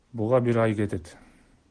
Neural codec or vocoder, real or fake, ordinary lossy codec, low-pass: none; real; Opus, 16 kbps; 9.9 kHz